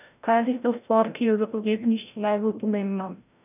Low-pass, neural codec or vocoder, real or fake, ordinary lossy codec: 3.6 kHz; codec, 16 kHz, 0.5 kbps, FreqCodec, larger model; fake; none